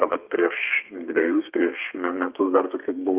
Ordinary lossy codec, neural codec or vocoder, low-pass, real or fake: Opus, 24 kbps; codec, 32 kHz, 1.9 kbps, SNAC; 3.6 kHz; fake